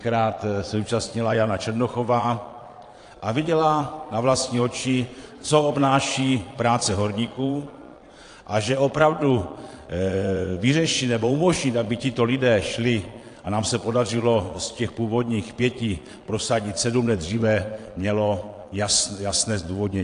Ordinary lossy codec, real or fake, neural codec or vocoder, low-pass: AAC, 48 kbps; fake; vocoder, 22.05 kHz, 80 mel bands, Vocos; 9.9 kHz